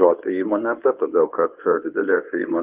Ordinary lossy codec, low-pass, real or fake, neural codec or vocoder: Opus, 32 kbps; 3.6 kHz; fake; codec, 24 kHz, 0.9 kbps, WavTokenizer, medium speech release version 1